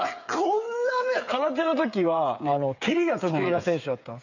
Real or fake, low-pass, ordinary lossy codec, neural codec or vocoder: fake; 7.2 kHz; none; codec, 16 kHz, 8 kbps, FreqCodec, smaller model